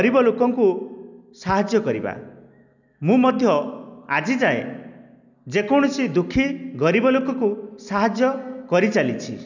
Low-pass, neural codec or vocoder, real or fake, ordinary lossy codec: 7.2 kHz; none; real; none